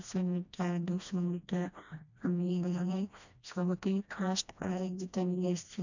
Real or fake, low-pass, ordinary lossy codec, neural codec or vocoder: fake; 7.2 kHz; none; codec, 16 kHz, 1 kbps, FreqCodec, smaller model